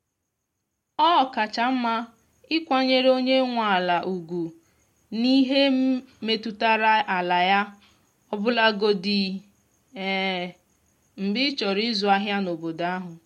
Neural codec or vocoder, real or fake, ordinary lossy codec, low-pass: none; real; MP3, 64 kbps; 19.8 kHz